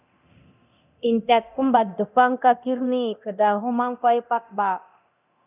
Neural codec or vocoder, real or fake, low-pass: codec, 24 kHz, 0.9 kbps, DualCodec; fake; 3.6 kHz